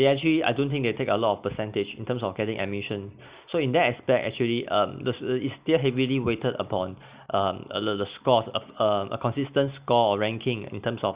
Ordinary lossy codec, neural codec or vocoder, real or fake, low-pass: Opus, 64 kbps; none; real; 3.6 kHz